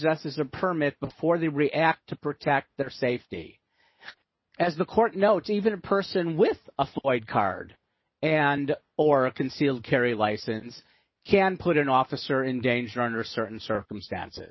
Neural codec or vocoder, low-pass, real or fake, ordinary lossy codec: none; 7.2 kHz; real; MP3, 24 kbps